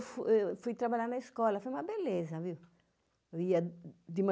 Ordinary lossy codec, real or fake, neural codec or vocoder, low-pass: none; real; none; none